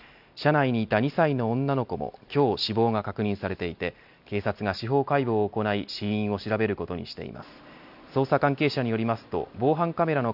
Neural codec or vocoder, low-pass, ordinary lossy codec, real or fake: none; 5.4 kHz; none; real